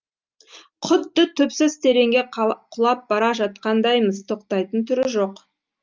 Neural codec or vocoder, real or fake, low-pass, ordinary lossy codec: none; real; 7.2 kHz; Opus, 32 kbps